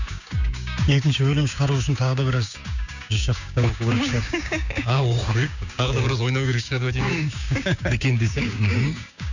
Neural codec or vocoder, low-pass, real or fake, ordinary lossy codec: codec, 16 kHz, 6 kbps, DAC; 7.2 kHz; fake; none